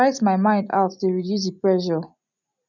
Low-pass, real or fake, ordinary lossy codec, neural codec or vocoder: 7.2 kHz; real; none; none